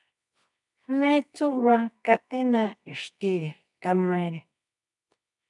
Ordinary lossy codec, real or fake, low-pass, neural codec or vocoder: MP3, 96 kbps; fake; 10.8 kHz; codec, 24 kHz, 0.9 kbps, WavTokenizer, medium music audio release